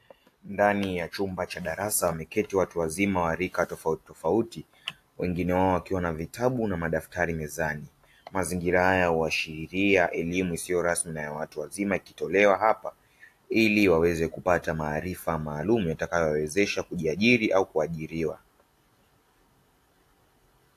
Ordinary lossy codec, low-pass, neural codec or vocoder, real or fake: AAC, 64 kbps; 14.4 kHz; none; real